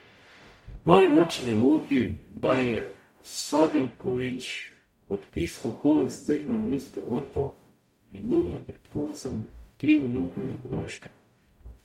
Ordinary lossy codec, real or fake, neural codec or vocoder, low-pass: MP3, 64 kbps; fake; codec, 44.1 kHz, 0.9 kbps, DAC; 19.8 kHz